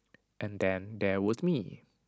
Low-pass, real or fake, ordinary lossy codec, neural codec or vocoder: none; real; none; none